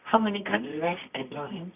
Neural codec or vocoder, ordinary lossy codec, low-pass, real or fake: codec, 24 kHz, 0.9 kbps, WavTokenizer, medium music audio release; none; 3.6 kHz; fake